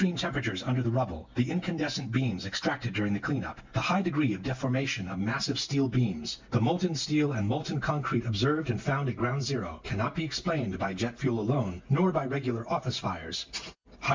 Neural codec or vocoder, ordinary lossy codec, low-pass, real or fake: none; MP3, 64 kbps; 7.2 kHz; real